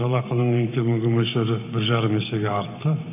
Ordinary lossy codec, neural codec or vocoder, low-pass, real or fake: none; codec, 44.1 kHz, 7.8 kbps, Pupu-Codec; 3.6 kHz; fake